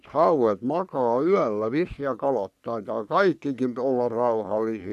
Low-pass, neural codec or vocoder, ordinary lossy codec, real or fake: 14.4 kHz; codec, 44.1 kHz, 3.4 kbps, Pupu-Codec; none; fake